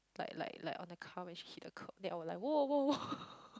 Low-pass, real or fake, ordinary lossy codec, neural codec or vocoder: none; real; none; none